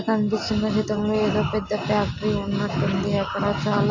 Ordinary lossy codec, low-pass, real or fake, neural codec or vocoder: AAC, 48 kbps; 7.2 kHz; real; none